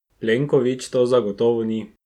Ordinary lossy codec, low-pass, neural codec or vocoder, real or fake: MP3, 96 kbps; 19.8 kHz; none; real